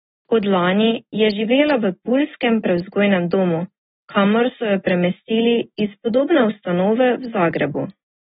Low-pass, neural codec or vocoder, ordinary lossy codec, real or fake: 10.8 kHz; none; AAC, 16 kbps; real